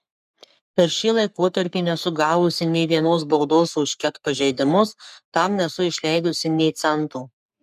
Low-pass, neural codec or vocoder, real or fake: 14.4 kHz; codec, 44.1 kHz, 3.4 kbps, Pupu-Codec; fake